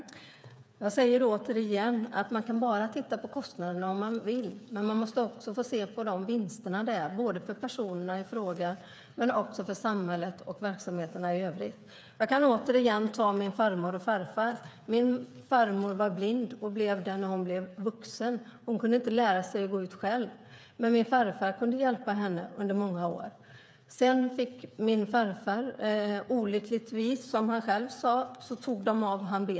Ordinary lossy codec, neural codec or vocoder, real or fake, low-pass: none; codec, 16 kHz, 8 kbps, FreqCodec, smaller model; fake; none